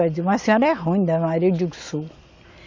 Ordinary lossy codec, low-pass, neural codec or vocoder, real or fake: MP3, 48 kbps; 7.2 kHz; none; real